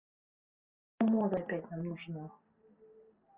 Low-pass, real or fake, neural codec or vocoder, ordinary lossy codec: 3.6 kHz; real; none; Opus, 24 kbps